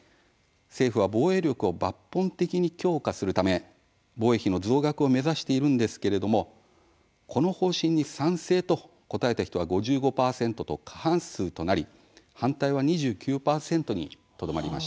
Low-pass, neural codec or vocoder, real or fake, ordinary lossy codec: none; none; real; none